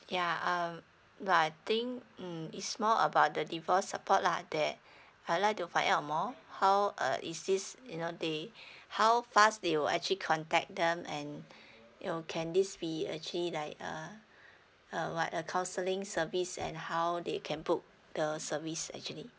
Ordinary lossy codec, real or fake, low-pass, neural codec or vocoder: none; real; none; none